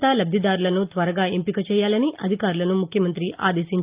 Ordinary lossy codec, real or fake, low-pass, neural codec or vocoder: Opus, 24 kbps; real; 3.6 kHz; none